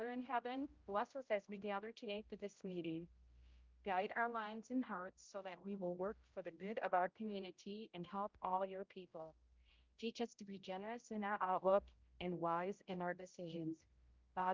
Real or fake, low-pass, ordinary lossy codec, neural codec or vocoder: fake; 7.2 kHz; Opus, 24 kbps; codec, 16 kHz, 0.5 kbps, X-Codec, HuBERT features, trained on general audio